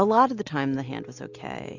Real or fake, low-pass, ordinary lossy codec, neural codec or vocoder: real; 7.2 kHz; AAC, 48 kbps; none